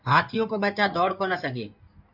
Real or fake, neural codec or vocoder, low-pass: fake; codec, 16 kHz in and 24 kHz out, 2.2 kbps, FireRedTTS-2 codec; 5.4 kHz